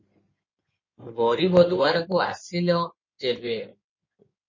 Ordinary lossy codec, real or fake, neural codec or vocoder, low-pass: MP3, 32 kbps; fake; codec, 16 kHz in and 24 kHz out, 2.2 kbps, FireRedTTS-2 codec; 7.2 kHz